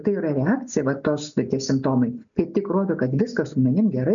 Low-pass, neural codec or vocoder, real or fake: 7.2 kHz; none; real